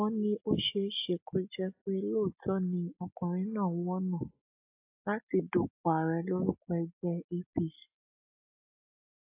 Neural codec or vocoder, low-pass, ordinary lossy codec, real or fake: none; 3.6 kHz; AAC, 32 kbps; real